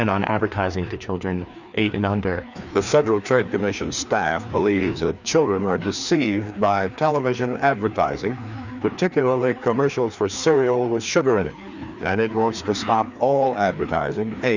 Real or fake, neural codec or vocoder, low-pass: fake; codec, 16 kHz, 2 kbps, FreqCodec, larger model; 7.2 kHz